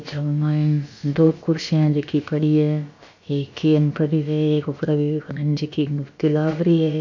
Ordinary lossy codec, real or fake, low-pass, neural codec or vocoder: MP3, 64 kbps; fake; 7.2 kHz; codec, 16 kHz, about 1 kbps, DyCAST, with the encoder's durations